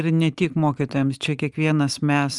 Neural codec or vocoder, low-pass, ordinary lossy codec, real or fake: none; 10.8 kHz; Opus, 32 kbps; real